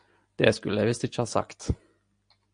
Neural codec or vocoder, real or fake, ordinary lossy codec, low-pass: none; real; AAC, 64 kbps; 9.9 kHz